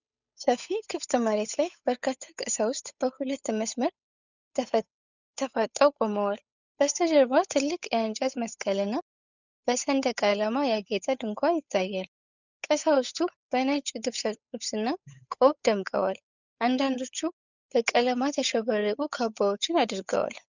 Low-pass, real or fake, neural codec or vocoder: 7.2 kHz; fake; codec, 16 kHz, 8 kbps, FunCodec, trained on Chinese and English, 25 frames a second